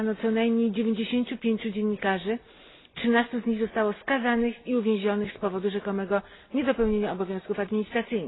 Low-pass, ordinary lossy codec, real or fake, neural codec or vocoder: 7.2 kHz; AAC, 16 kbps; real; none